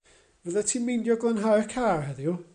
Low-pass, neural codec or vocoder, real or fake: 9.9 kHz; none; real